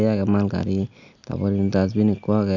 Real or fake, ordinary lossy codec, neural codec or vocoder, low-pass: real; none; none; 7.2 kHz